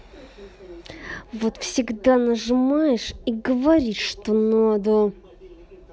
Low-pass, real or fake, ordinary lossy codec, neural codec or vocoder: none; real; none; none